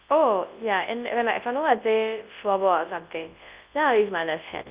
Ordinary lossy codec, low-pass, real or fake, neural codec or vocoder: Opus, 24 kbps; 3.6 kHz; fake; codec, 24 kHz, 0.9 kbps, WavTokenizer, large speech release